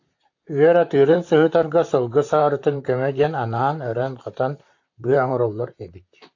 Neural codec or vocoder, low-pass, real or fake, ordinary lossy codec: vocoder, 44.1 kHz, 128 mel bands, Pupu-Vocoder; 7.2 kHz; fake; AAC, 48 kbps